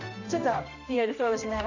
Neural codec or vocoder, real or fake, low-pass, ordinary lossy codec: codec, 16 kHz, 1 kbps, X-Codec, HuBERT features, trained on general audio; fake; 7.2 kHz; none